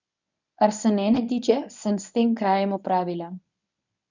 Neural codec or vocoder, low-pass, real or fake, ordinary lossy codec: codec, 24 kHz, 0.9 kbps, WavTokenizer, medium speech release version 1; 7.2 kHz; fake; none